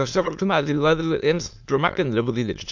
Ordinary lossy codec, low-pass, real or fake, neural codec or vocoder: MP3, 64 kbps; 7.2 kHz; fake; autoencoder, 22.05 kHz, a latent of 192 numbers a frame, VITS, trained on many speakers